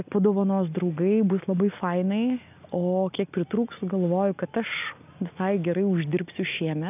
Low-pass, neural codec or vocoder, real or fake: 3.6 kHz; none; real